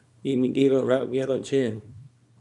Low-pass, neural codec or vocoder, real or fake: 10.8 kHz; codec, 24 kHz, 0.9 kbps, WavTokenizer, small release; fake